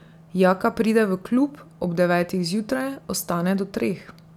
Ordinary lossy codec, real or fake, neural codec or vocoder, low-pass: none; real; none; 19.8 kHz